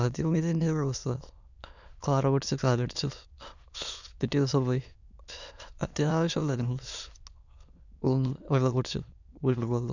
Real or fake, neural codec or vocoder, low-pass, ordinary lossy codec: fake; autoencoder, 22.05 kHz, a latent of 192 numbers a frame, VITS, trained on many speakers; 7.2 kHz; none